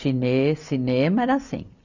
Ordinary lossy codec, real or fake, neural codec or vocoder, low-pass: none; real; none; 7.2 kHz